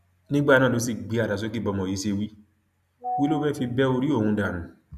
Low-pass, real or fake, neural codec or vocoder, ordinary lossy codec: 14.4 kHz; real; none; none